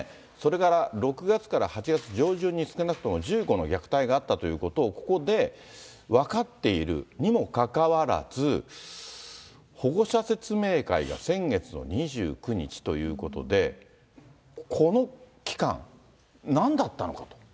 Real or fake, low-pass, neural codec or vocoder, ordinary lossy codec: real; none; none; none